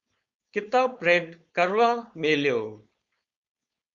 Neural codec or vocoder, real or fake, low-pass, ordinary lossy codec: codec, 16 kHz, 4.8 kbps, FACodec; fake; 7.2 kHz; Opus, 64 kbps